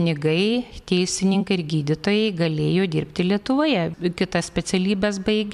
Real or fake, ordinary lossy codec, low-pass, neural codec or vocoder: fake; MP3, 96 kbps; 14.4 kHz; vocoder, 44.1 kHz, 128 mel bands every 256 samples, BigVGAN v2